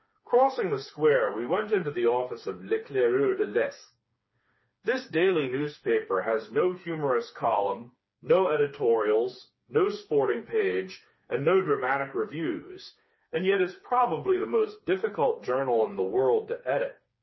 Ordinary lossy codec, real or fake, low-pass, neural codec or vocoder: MP3, 24 kbps; fake; 7.2 kHz; codec, 16 kHz, 4 kbps, FreqCodec, smaller model